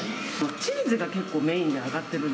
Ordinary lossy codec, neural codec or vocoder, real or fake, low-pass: none; none; real; none